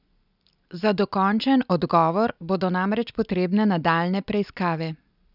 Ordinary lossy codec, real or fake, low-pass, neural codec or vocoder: none; real; 5.4 kHz; none